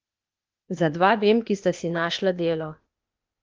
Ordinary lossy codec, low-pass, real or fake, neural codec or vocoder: Opus, 24 kbps; 7.2 kHz; fake; codec, 16 kHz, 0.8 kbps, ZipCodec